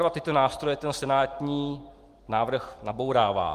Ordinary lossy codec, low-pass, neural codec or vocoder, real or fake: Opus, 24 kbps; 14.4 kHz; none; real